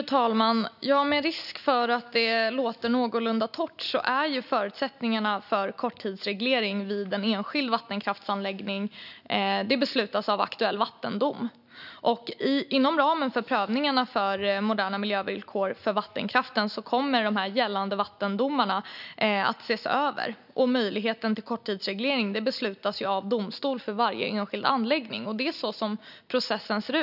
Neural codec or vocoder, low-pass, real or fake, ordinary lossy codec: none; 5.4 kHz; real; none